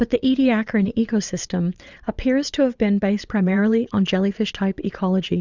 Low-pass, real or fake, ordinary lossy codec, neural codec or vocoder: 7.2 kHz; fake; Opus, 64 kbps; vocoder, 22.05 kHz, 80 mel bands, Vocos